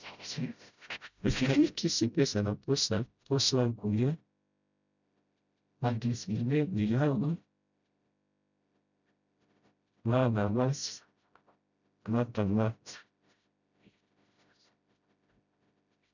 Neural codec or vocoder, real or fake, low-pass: codec, 16 kHz, 0.5 kbps, FreqCodec, smaller model; fake; 7.2 kHz